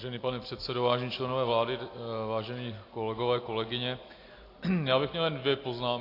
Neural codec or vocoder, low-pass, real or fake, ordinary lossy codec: none; 5.4 kHz; real; AAC, 32 kbps